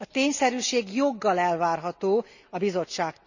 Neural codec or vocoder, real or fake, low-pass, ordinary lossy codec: none; real; 7.2 kHz; none